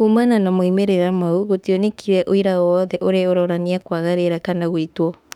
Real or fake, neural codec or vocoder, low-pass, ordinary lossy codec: fake; autoencoder, 48 kHz, 32 numbers a frame, DAC-VAE, trained on Japanese speech; 19.8 kHz; none